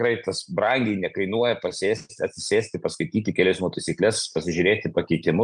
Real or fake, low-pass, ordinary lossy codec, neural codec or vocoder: real; 9.9 kHz; Opus, 32 kbps; none